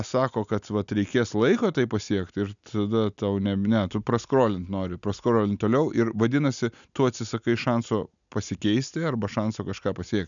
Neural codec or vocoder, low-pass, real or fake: none; 7.2 kHz; real